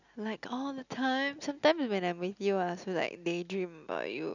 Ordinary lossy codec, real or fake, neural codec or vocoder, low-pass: none; real; none; 7.2 kHz